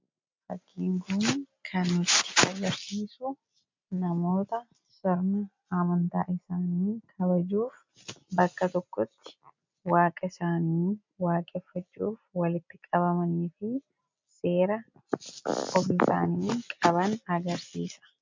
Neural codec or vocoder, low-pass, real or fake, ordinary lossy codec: none; 7.2 kHz; real; MP3, 64 kbps